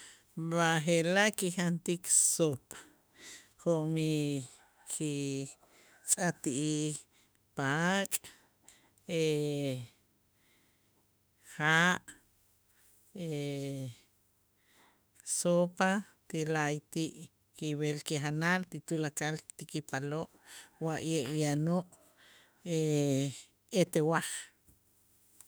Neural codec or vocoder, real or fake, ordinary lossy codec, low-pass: autoencoder, 48 kHz, 32 numbers a frame, DAC-VAE, trained on Japanese speech; fake; none; none